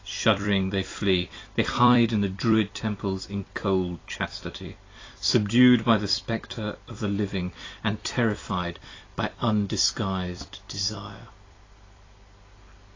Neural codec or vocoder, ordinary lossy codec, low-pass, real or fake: vocoder, 44.1 kHz, 128 mel bands every 256 samples, BigVGAN v2; AAC, 32 kbps; 7.2 kHz; fake